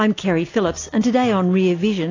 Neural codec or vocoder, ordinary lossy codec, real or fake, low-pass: none; AAC, 32 kbps; real; 7.2 kHz